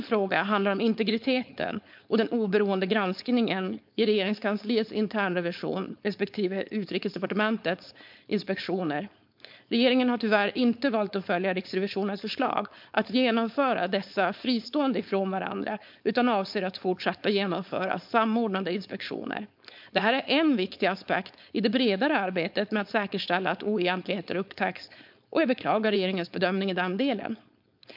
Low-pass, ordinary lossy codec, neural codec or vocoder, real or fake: 5.4 kHz; MP3, 48 kbps; codec, 16 kHz, 4.8 kbps, FACodec; fake